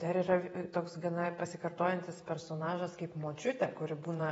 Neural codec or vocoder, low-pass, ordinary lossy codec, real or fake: vocoder, 48 kHz, 128 mel bands, Vocos; 19.8 kHz; AAC, 24 kbps; fake